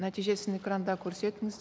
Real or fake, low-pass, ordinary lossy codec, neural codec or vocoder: real; none; none; none